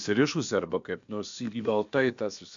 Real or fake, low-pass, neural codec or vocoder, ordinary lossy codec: fake; 7.2 kHz; codec, 16 kHz, about 1 kbps, DyCAST, with the encoder's durations; MP3, 64 kbps